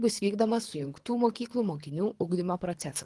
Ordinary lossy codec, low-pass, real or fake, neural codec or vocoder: Opus, 24 kbps; 10.8 kHz; fake; codec, 24 kHz, 3 kbps, HILCodec